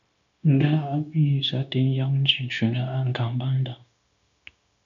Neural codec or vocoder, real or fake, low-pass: codec, 16 kHz, 0.9 kbps, LongCat-Audio-Codec; fake; 7.2 kHz